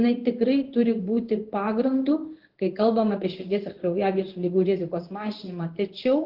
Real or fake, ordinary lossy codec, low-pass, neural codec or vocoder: fake; Opus, 16 kbps; 5.4 kHz; codec, 16 kHz in and 24 kHz out, 1 kbps, XY-Tokenizer